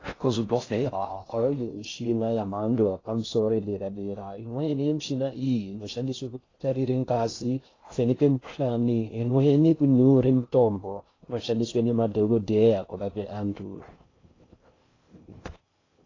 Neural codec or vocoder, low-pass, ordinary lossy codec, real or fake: codec, 16 kHz in and 24 kHz out, 0.6 kbps, FocalCodec, streaming, 2048 codes; 7.2 kHz; AAC, 32 kbps; fake